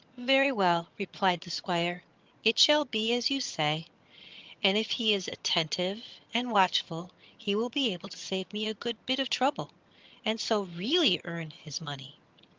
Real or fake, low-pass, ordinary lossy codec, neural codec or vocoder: fake; 7.2 kHz; Opus, 32 kbps; vocoder, 22.05 kHz, 80 mel bands, HiFi-GAN